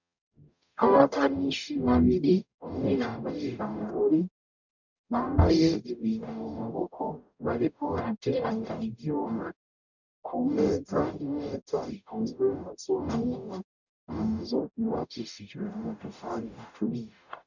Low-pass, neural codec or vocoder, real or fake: 7.2 kHz; codec, 44.1 kHz, 0.9 kbps, DAC; fake